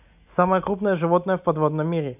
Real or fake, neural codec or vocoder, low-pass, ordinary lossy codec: real; none; 3.6 kHz; none